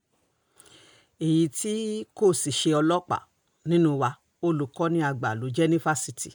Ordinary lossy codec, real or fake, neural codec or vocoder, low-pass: none; real; none; none